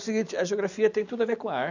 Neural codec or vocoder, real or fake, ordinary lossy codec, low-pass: none; real; none; 7.2 kHz